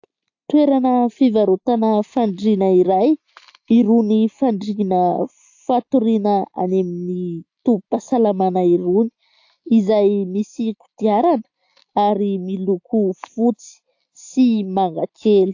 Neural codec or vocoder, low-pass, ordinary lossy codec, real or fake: none; 7.2 kHz; AAC, 48 kbps; real